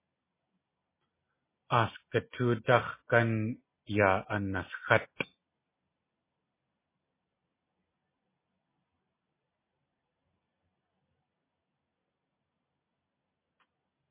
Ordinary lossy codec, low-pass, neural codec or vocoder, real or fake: MP3, 16 kbps; 3.6 kHz; none; real